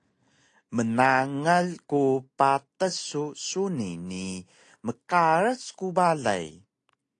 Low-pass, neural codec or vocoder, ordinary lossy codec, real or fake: 10.8 kHz; none; AAC, 48 kbps; real